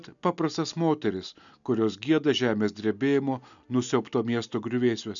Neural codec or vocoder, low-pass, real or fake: none; 7.2 kHz; real